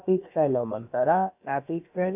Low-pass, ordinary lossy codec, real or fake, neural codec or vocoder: 3.6 kHz; AAC, 32 kbps; fake; codec, 16 kHz, about 1 kbps, DyCAST, with the encoder's durations